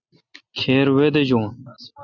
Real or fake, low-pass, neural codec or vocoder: real; 7.2 kHz; none